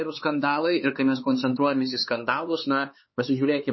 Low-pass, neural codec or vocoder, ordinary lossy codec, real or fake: 7.2 kHz; codec, 16 kHz, 4 kbps, X-Codec, HuBERT features, trained on LibriSpeech; MP3, 24 kbps; fake